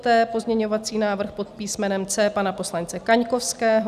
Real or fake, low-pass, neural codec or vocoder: real; 14.4 kHz; none